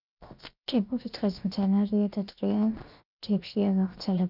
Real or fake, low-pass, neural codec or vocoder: fake; 5.4 kHz; codec, 16 kHz, 0.7 kbps, FocalCodec